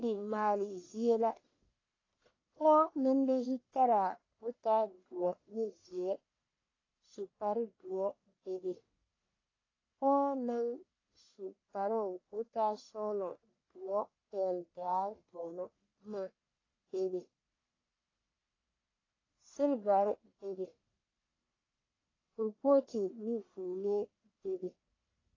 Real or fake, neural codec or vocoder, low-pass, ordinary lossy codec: fake; codec, 44.1 kHz, 1.7 kbps, Pupu-Codec; 7.2 kHz; AAC, 32 kbps